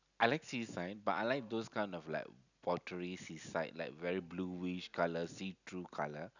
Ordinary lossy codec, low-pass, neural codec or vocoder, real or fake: none; 7.2 kHz; none; real